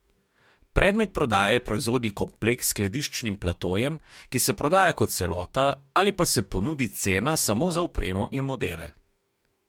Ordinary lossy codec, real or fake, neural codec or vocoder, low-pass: MP3, 96 kbps; fake; codec, 44.1 kHz, 2.6 kbps, DAC; 19.8 kHz